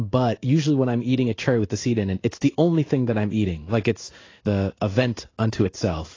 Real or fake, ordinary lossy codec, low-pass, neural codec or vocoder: fake; AAC, 32 kbps; 7.2 kHz; codec, 16 kHz in and 24 kHz out, 1 kbps, XY-Tokenizer